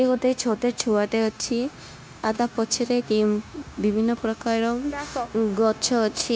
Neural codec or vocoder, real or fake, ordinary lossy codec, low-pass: codec, 16 kHz, 0.9 kbps, LongCat-Audio-Codec; fake; none; none